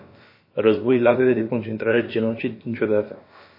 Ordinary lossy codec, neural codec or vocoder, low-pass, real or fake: MP3, 24 kbps; codec, 16 kHz, about 1 kbps, DyCAST, with the encoder's durations; 5.4 kHz; fake